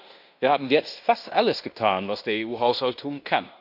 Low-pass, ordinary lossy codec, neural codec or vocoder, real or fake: 5.4 kHz; none; codec, 16 kHz in and 24 kHz out, 0.9 kbps, LongCat-Audio-Codec, fine tuned four codebook decoder; fake